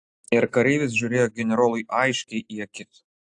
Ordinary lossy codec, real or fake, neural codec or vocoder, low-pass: AAC, 64 kbps; real; none; 10.8 kHz